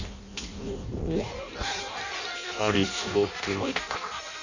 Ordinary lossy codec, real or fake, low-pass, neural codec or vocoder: none; fake; 7.2 kHz; codec, 16 kHz in and 24 kHz out, 0.6 kbps, FireRedTTS-2 codec